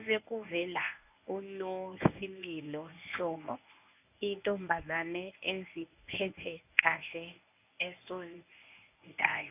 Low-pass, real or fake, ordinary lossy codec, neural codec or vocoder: 3.6 kHz; fake; none; codec, 24 kHz, 0.9 kbps, WavTokenizer, medium speech release version 1